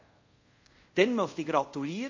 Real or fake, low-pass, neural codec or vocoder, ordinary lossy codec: fake; 7.2 kHz; codec, 24 kHz, 0.5 kbps, DualCodec; MP3, 32 kbps